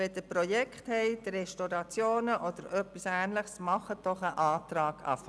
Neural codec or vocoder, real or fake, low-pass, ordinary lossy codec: none; real; none; none